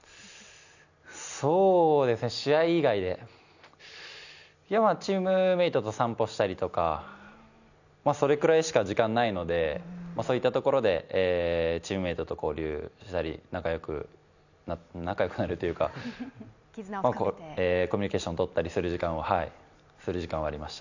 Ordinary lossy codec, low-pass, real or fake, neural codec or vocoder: none; 7.2 kHz; real; none